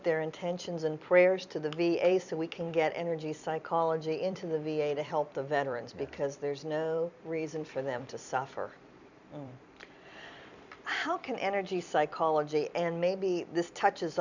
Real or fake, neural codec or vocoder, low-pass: fake; vocoder, 44.1 kHz, 128 mel bands every 256 samples, BigVGAN v2; 7.2 kHz